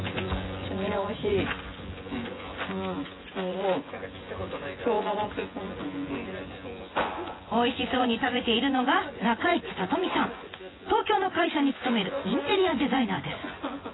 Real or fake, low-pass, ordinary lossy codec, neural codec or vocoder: fake; 7.2 kHz; AAC, 16 kbps; vocoder, 24 kHz, 100 mel bands, Vocos